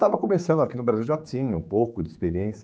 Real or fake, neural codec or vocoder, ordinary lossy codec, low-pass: fake; codec, 16 kHz, 4 kbps, X-Codec, HuBERT features, trained on general audio; none; none